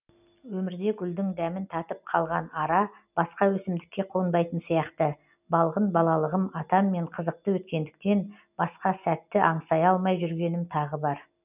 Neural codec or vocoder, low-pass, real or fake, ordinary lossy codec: none; 3.6 kHz; real; none